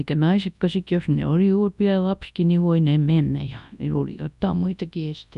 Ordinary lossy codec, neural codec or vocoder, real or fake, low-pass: none; codec, 24 kHz, 0.9 kbps, WavTokenizer, large speech release; fake; 10.8 kHz